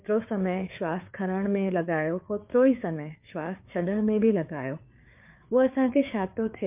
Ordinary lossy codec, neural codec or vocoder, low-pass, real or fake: MP3, 32 kbps; codec, 16 kHz, 4 kbps, FreqCodec, larger model; 3.6 kHz; fake